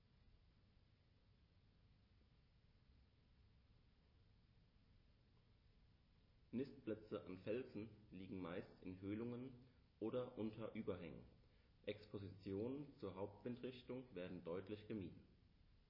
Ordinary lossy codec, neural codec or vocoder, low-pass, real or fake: MP3, 24 kbps; none; 5.4 kHz; real